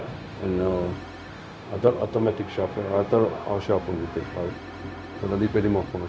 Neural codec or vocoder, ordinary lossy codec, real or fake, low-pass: codec, 16 kHz, 0.4 kbps, LongCat-Audio-Codec; none; fake; none